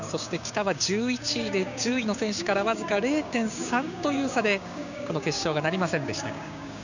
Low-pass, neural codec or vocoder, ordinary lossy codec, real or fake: 7.2 kHz; codec, 16 kHz, 6 kbps, DAC; none; fake